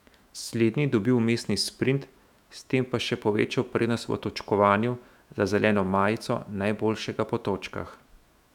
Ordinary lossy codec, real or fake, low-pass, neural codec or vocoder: none; fake; 19.8 kHz; autoencoder, 48 kHz, 128 numbers a frame, DAC-VAE, trained on Japanese speech